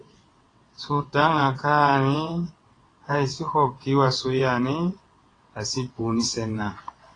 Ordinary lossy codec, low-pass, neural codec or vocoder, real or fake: AAC, 32 kbps; 9.9 kHz; vocoder, 22.05 kHz, 80 mel bands, WaveNeXt; fake